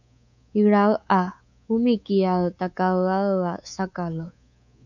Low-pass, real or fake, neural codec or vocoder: 7.2 kHz; fake; codec, 24 kHz, 3.1 kbps, DualCodec